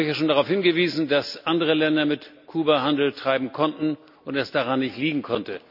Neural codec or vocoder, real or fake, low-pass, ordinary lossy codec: none; real; 5.4 kHz; none